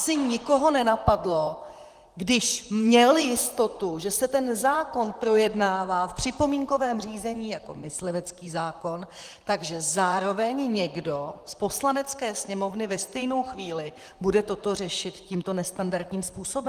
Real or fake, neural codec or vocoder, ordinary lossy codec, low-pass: fake; vocoder, 44.1 kHz, 128 mel bands, Pupu-Vocoder; Opus, 24 kbps; 14.4 kHz